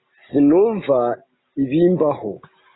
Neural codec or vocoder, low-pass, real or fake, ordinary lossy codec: none; 7.2 kHz; real; AAC, 16 kbps